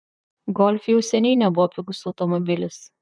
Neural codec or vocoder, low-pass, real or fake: vocoder, 44.1 kHz, 128 mel bands, Pupu-Vocoder; 9.9 kHz; fake